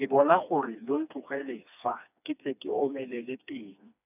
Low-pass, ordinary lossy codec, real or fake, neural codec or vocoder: 3.6 kHz; none; fake; codec, 16 kHz, 2 kbps, FreqCodec, smaller model